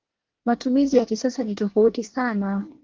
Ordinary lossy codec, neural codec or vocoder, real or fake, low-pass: Opus, 16 kbps; codec, 24 kHz, 1 kbps, SNAC; fake; 7.2 kHz